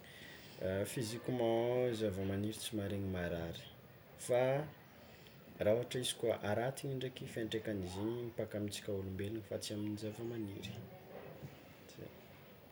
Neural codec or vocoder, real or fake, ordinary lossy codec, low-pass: none; real; none; none